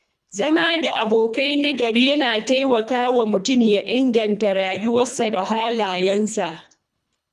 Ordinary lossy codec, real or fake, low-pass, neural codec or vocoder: none; fake; none; codec, 24 kHz, 1.5 kbps, HILCodec